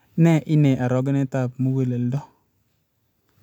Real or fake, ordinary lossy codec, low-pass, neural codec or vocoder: real; none; 19.8 kHz; none